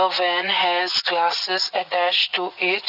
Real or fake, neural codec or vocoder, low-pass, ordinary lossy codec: fake; vocoder, 44.1 kHz, 80 mel bands, Vocos; 5.4 kHz; none